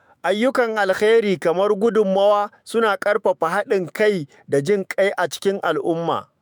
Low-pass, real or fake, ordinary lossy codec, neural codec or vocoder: none; fake; none; autoencoder, 48 kHz, 128 numbers a frame, DAC-VAE, trained on Japanese speech